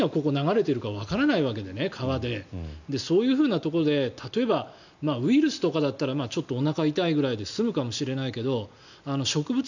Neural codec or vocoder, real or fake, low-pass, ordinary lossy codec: none; real; 7.2 kHz; none